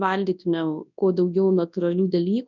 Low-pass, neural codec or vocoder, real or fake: 7.2 kHz; codec, 24 kHz, 0.9 kbps, WavTokenizer, large speech release; fake